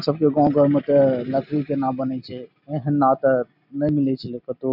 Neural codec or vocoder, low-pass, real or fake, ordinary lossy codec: none; 5.4 kHz; real; none